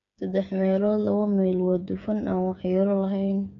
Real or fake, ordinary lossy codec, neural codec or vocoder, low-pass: fake; MP3, 96 kbps; codec, 16 kHz, 8 kbps, FreqCodec, smaller model; 7.2 kHz